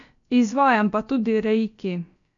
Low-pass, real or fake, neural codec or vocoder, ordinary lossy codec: 7.2 kHz; fake; codec, 16 kHz, about 1 kbps, DyCAST, with the encoder's durations; none